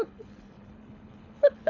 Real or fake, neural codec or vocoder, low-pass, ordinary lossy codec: fake; codec, 24 kHz, 6 kbps, HILCodec; 7.2 kHz; none